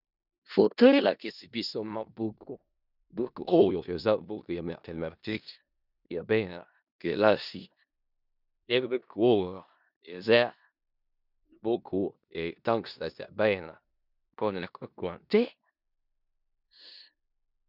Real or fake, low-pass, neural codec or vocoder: fake; 5.4 kHz; codec, 16 kHz in and 24 kHz out, 0.4 kbps, LongCat-Audio-Codec, four codebook decoder